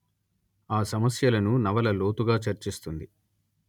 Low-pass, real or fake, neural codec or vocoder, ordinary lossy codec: 19.8 kHz; real; none; none